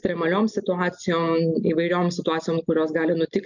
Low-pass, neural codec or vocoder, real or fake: 7.2 kHz; none; real